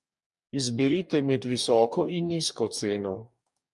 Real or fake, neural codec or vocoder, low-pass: fake; codec, 44.1 kHz, 2.6 kbps, DAC; 10.8 kHz